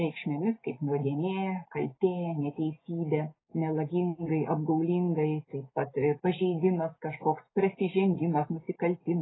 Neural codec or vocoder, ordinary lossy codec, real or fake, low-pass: none; AAC, 16 kbps; real; 7.2 kHz